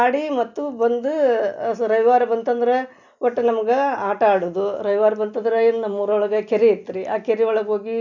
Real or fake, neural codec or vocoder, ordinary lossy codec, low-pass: real; none; none; 7.2 kHz